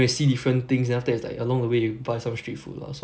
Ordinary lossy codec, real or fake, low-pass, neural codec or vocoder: none; real; none; none